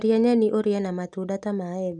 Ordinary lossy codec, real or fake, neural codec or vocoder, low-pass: none; real; none; 10.8 kHz